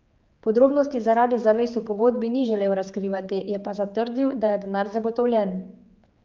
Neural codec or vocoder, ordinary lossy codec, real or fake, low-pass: codec, 16 kHz, 4 kbps, X-Codec, HuBERT features, trained on general audio; Opus, 24 kbps; fake; 7.2 kHz